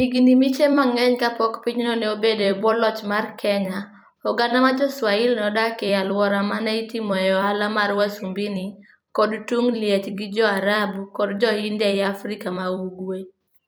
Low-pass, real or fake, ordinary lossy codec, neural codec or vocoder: none; fake; none; vocoder, 44.1 kHz, 128 mel bands every 256 samples, BigVGAN v2